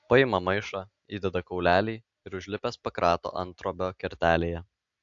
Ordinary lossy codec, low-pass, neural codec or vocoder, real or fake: AAC, 64 kbps; 7.2 kHz; none; real